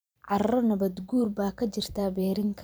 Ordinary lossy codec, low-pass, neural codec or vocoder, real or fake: none; none; vocoder, 44.1 kHz, 128 mel bands every 512 samples, BigVGAN v2; fake